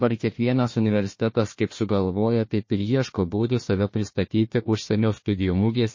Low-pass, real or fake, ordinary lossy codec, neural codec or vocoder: 7.2 kHz; fake; MP3, 32 kbps; codec, 16 kHz, 1 kbps, FunCodec, trained on Chinese and English, 50 frames a second